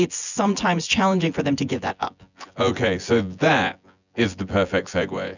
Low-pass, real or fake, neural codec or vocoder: 7.2 kHz; fake; vocoder, 24 kHz, 100 mel bands, Vocos